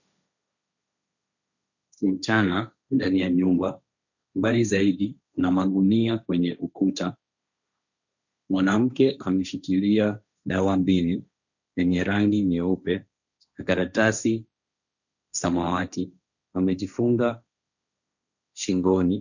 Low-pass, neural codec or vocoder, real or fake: 7.2 kHz; codec, 16 kHz, 1.1 kbps, Voila-Tokenizer; fake